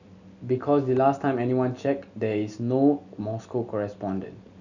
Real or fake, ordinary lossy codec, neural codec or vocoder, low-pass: real; none; none; 7.2 kHz